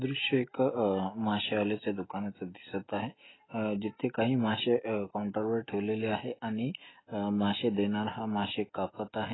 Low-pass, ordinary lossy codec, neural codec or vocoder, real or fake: 7.2 kHz; AAC, 16 kbps; none; real